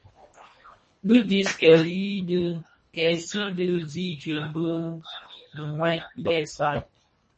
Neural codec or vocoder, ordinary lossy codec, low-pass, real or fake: codec, 24 kHz, 1.5 kbps, HILCodec; MP3, 32 kbps; 10.8 kHz; fake